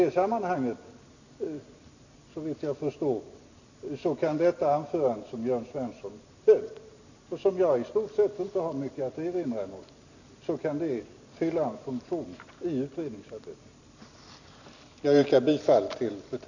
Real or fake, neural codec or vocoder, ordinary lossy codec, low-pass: real; none; none; 7.2 kHz